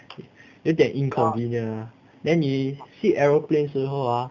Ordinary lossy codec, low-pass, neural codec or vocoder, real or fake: none; 7.2 kHz; none; real